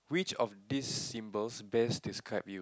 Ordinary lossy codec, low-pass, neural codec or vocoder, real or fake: none; none; none; real